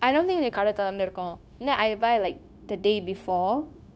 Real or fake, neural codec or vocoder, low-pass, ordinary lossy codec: fake; codec, 16 kHz, 0.9 kbps, LongCat-Audio-Codec; none; none